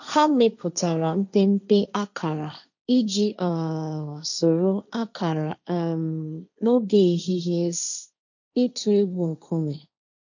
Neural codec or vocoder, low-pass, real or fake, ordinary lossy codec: codec, 16 kHz, 1.1 kbps, Voila-Tokenizer; 7.2 kHz; fake; none